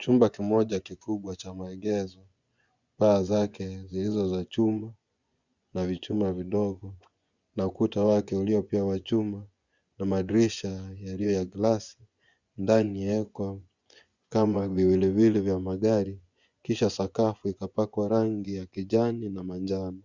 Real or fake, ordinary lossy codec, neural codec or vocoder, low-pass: fake; Opus, 64 kbps; vocoder, 24 kHz, 100 mel bands, Vocos; 7.2 kHz